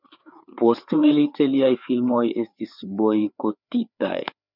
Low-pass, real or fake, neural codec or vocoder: 5.4 kHz; fake; codec, 16 kHz, 4 kbps, FreqCodec, larger model